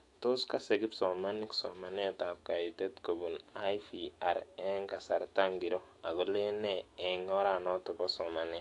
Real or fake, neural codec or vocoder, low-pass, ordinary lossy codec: fake; autoencoder, 48 kHz, 128 numbers a frame, DAC-VAE, trained on Japanese speech; 10.8 kHz; AAC, 48 kbps